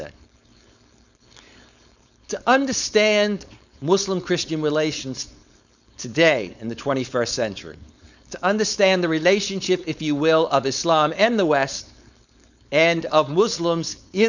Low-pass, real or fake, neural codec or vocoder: 7.2 kHz; fake; codec, 16 kHz, 4.8 kbps, FACodec